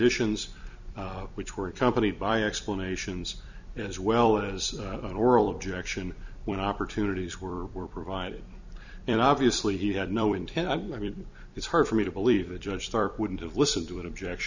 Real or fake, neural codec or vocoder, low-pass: fake; vocoder, 44.1 kHz, 128 mel bands every 256 samples, BigVGAN v2; 7.2 kHz